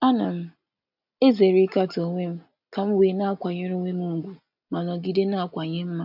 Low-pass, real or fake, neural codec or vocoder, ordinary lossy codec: 5.4 kHz; real; none; none